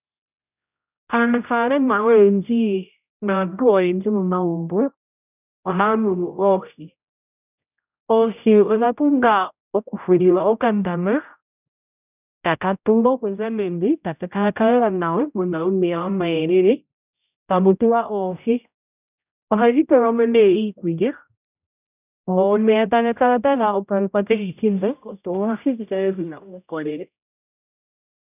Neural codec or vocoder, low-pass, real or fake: codec, 16 kHz, 0.5 kbps, X-Codec, HuBERT features, trained on general audio; 3.6 kHz; fake